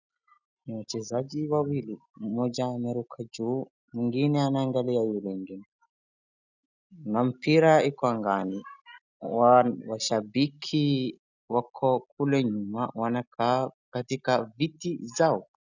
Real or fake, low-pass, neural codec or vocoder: real; 7.2 kHz; none